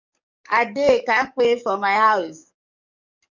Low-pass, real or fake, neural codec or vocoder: 7.2 kHz; fake; codec, 44.1 kHz, 7.8 kbps, DAC